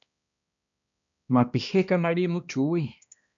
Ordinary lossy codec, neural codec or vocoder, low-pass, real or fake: MP3, 64 kbps; codec, 16 kHz, 1 kbps, X-Codec, HuBERT features, trained on balanced general audio; 7.2 kHz; fake